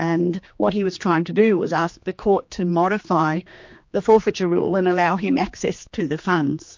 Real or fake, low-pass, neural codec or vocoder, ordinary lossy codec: fake; 7.2 kHz; codec, 16 kHz, 2 kbps, X-Codec, HuBERT features, trained on general audio; MP3, 48 kbps